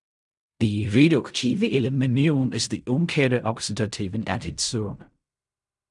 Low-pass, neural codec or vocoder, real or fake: 10.8 kHz; codec, 16 kHz in and 24 kHz out, 0.4 kbps, LongCat-Audio-Codec, fine tuned four codebook decoder; fake